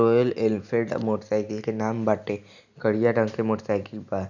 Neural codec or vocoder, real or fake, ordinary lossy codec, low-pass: none; real; none; 7.2 kHz